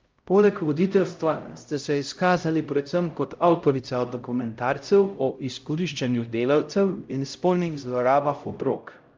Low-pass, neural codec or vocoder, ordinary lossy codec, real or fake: 7.2 kHz; codec, 16 kHz, 0.5 kbps, X-Codec, HuBERT features, trained on LibriSpeech; Opus, 24 kbps; fake